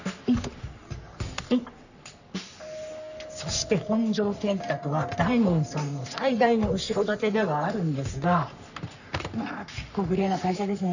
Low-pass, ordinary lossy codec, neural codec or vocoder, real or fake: 7.2 kHz; none; codec, 44.1 kHz, 3.4 kbps, Pupu-Codec; fake